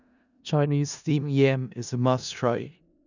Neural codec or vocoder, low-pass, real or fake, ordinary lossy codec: codec, 16 kHz in and 24 kHz out, 0.4 kbps, LongCat-Audio-Codec, four codebook decoder; 7.2 kHz; fake; none